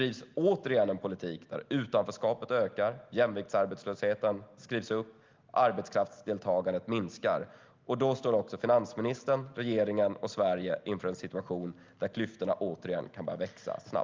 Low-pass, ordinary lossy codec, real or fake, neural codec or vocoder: 7.2 kHz; Opus, 32 kbps; real; none